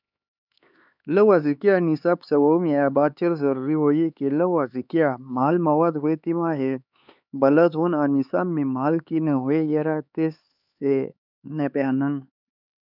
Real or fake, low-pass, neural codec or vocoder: fake; 5.4 kHz; codec, 16 kHz, 4 kbps, X-Codec, HuBERT features, trained on LibriSpeech